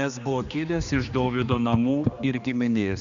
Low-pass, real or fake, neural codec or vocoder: 7.2 kHz; fake; codec, 16 kHz, 2 kbps, X-Codec, HuBERT features, trained on general audio